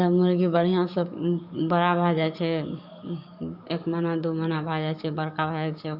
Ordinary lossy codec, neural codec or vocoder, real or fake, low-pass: none; codec, 44.1 kHz, 7.8 kbps, DAC; fake; 5.4 kHz